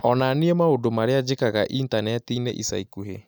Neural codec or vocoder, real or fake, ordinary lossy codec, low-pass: none; real; none; none